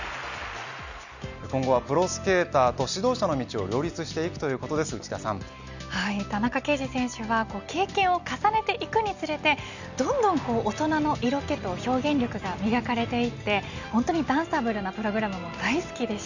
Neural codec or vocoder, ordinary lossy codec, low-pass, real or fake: none; none; 7.2 kHz; real